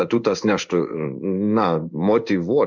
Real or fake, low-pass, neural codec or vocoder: fake; 7.2 kHz; codec, 16 kHz in and 24 kHz out, 1 kbps, XY-Tokenizer